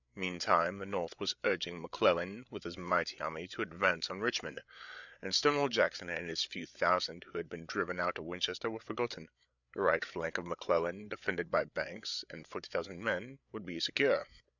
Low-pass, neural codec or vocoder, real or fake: 7.2 kHz; codec, 16 kHz, 8 kbps, FreqCodec, larger model; fake